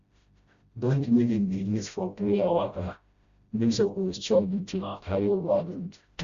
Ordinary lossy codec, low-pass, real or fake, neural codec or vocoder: Opus, 64 kbps; 7.2 kHz; fake; codec, 16 kHz, 0.5 kbps, FreqCodec, smaller model